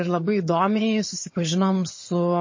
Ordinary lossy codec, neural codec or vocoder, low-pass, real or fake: MP3, 32 kbps; vocoder, 22.05 kHz, 80 mel bands, HiFi-GAN; 7.2 kHz; fake